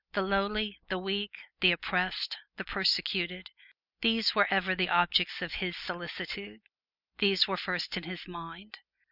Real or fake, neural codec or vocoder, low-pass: real; none; 5.4 kHz